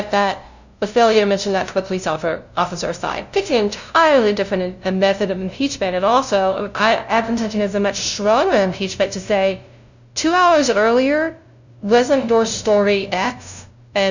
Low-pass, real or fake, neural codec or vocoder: 7.2 kHz; fake; codec, 16 kHz, 0.5 kbps, FunCodec, trained on LibriTTS, 25 frames a second